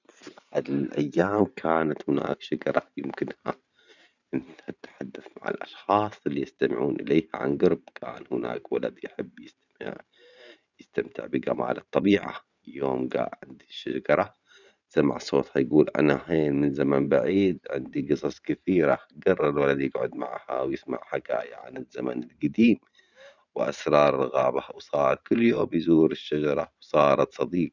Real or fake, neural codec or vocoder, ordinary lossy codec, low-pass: fake; vocoder, 44.1 kHz, 128 mel bands every 512 samples, BigVGAN v2; none; 7.2 kHz